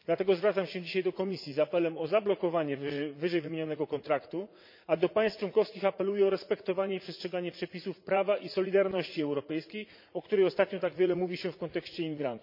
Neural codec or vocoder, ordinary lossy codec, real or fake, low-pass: vocoder, 44.1 kHz, 80 mel bands, Vocos; MP3, 32 kbps; fake; 5.4 kHz